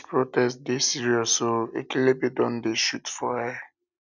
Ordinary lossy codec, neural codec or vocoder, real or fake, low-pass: none; none; real; 7.2 kHz